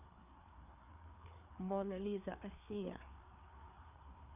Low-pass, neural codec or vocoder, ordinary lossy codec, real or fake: 3.6 kHz; codec, 16 kHz, 4 kbps, FreqCodec, larger model; none; fake